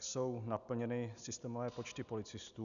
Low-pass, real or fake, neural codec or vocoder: 7.2 kHz; real; none